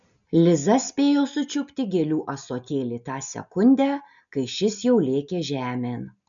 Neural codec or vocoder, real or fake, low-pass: none; real; 7.2 kHz